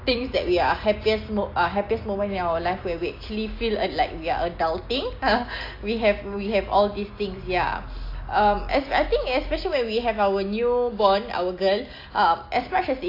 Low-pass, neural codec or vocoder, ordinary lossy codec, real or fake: 5.4 kHz; none; AAC, 32 kbps; real